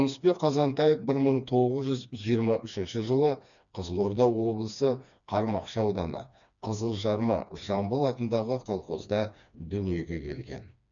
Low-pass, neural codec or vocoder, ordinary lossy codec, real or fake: 7.2 kHz; codec, 16 kHz, 2 kbps, FreqCodec, smaller model; none; fake